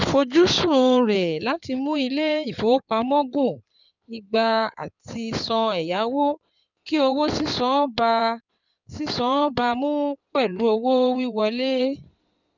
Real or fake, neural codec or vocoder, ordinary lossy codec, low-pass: fake; codec, 16 kHz in and 24 kHz out, 2.2 kbps, FireRedTTS-2 codec; none; 7.2 kHz